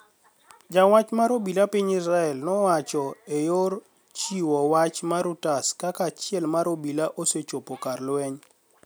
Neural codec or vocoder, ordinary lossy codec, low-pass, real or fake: none; none; none; real